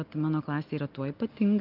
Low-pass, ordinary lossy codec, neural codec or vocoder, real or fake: 5.4 kHz; Opus, 24 kbps; none; real